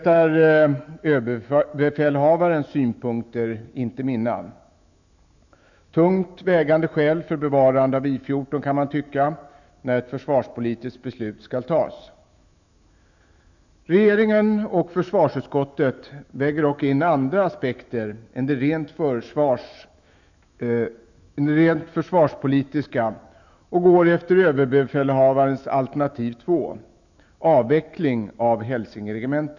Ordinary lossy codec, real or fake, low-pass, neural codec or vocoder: none; fake; 7.2 kHz; autoencoder, 48 kHz, 128 numbers a frame, DAC-VAE, trained on Japanese speech